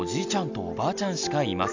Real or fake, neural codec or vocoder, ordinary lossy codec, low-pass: real; none; MP3, 64 kbps; 7.2 kHz